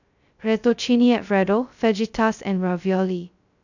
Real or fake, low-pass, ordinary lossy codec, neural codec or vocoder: fake; 7.2 kHz; none; codec, 16 kHz, 0.2 kbps, FocalCodec